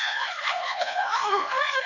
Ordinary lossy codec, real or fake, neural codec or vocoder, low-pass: none; fake; codec, 24 kHz, 1.2 kbps, DualCodec; 7.2 kHz